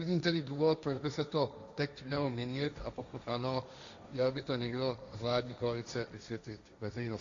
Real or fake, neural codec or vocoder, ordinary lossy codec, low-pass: fake; codec, 16 kHz, 1.1 kbps, Voila-Tokenizer; Opus, 64 kbps; 7.2 kHz